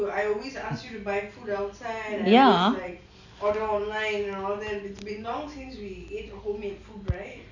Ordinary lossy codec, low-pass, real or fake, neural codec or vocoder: none; 7.2 kHz; real; none